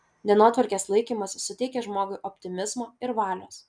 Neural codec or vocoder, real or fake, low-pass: none; real; 9.9 kHz